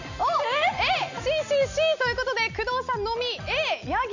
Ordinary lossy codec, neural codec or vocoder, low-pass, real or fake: none; none; 7.2 kHz; real